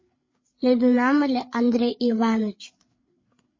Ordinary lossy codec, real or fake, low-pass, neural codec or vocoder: MP3, 32 kbps; fake; 7.2 kHz; codec, 16 kHz, 8 kbps, FreqCodec, larger model